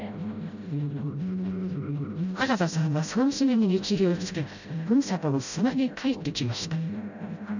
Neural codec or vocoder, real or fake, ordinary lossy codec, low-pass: codec, 16 kHz, 0.5 kbps, FreqCodec, smaller model; fake; none; 7.2 kHz